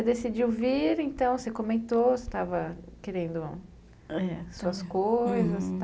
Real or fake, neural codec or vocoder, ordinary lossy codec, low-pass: real; none; none; none